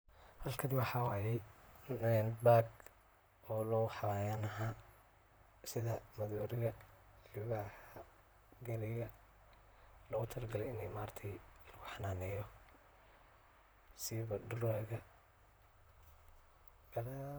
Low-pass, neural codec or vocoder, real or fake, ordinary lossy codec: none; vocoder, 44.1 kHz, 128 mel bands, Pupu-Vocoder; fake; none